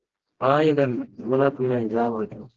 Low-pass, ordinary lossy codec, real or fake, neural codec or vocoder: 7.2 kHz; Opus, 16 kbps; fake; codec, 16 kHz, 1 kbps, FreqCodec, smaller model